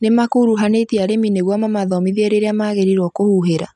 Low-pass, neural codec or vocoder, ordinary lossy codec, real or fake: 10.8 kHz; none; none; real